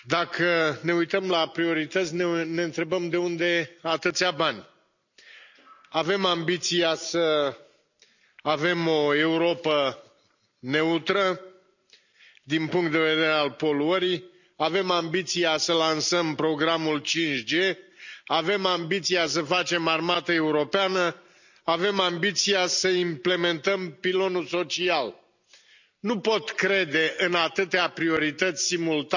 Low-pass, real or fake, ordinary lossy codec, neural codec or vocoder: 7.2 kHz; real; none; none